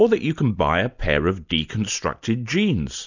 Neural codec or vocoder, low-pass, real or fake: none; 7.2 kHz; real